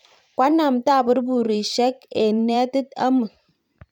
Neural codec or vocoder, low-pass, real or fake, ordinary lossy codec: vocoder, 44.1 kHz, 128 mel bands every 512 samples, BigVGAN v2; 19.8 kHz; fake; none